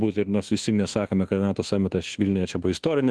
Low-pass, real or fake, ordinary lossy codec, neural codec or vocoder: 10.8 kHz; fake; Opus, 16 kbps; codec, 24 kHz, 1.2 kbps, DualCodec